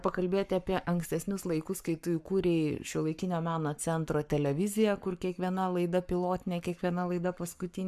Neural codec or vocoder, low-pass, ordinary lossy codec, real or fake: codec, 44.1 kHz, 7.8 kbps, Pupu-Codec; 14.4 kHz; MP3, 96 kbps; fake